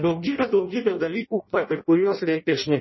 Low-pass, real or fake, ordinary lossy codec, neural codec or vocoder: 7.2 kHz; fake; MP3, 24 kbps; codec, 16 kHz in and 24 kHz out, 0.6 kbps, FireRedTTS-2 codec